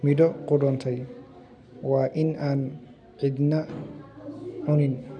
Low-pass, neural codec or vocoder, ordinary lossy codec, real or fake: 9.9 kHz; none; none; real